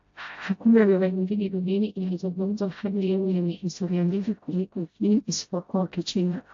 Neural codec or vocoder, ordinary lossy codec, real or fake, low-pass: codec, 16 kHz, 0.5 kbps, FreqCodec, smaller model; AAC, 48 kbps; fake; 7.2 kHz